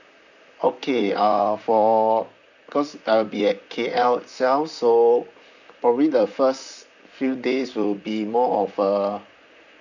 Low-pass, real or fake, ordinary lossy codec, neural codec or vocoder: 7.2 kHz; fake; none; vocoder, 44.1 kHz, 128 mel bands, Pupu-Vocoder